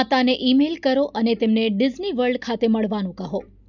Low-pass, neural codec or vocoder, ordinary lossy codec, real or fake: 7.2 kHz; none; none; real